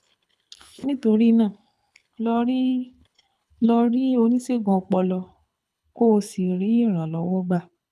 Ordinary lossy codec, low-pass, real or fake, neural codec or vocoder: none; none; fake; codec, 24 kHz, 6 kbps, HILCodec